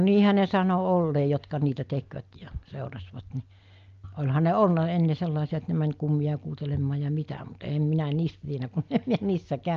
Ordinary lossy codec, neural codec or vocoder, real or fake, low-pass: Opus, 24 kbps; none; real; 7.2 kHz